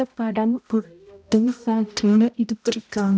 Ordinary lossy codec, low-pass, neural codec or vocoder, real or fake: none; none; codec, 16 kHz, 0.5 kbps, X-Codec, HuBERT features, trained on balanced general audio; fake